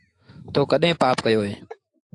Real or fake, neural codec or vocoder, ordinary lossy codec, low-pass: fake; autoencoder, 48 kHz, 128 numbers a frame, DAC-VAE, trained on Japanese speech; AAC, 48 kbps; 10.8 kHz